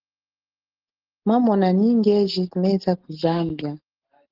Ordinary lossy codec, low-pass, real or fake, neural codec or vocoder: Opus, 16 kbps; 5.4 kHz; real; none